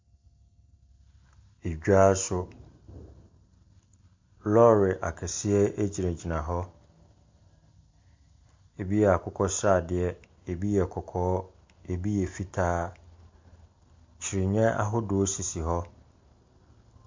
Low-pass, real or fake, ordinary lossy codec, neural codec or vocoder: 7.2 kHz; real; MP3, 48 kbps; none